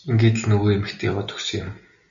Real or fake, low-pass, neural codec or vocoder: real; 7.2 kHz; none